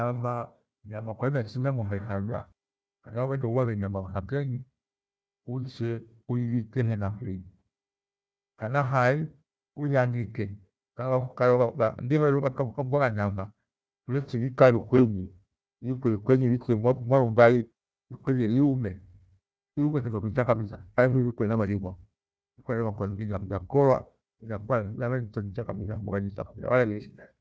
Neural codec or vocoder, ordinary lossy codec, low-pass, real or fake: codec, 16 kHz, 1 kbps, FunCodec, trained on Chinese and English, 50 frames a second; none; none; fake